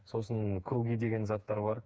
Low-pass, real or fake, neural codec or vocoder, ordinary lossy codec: none; fake; codec, 16 kHz, 4 kbps, FreqCodec, smaller model; none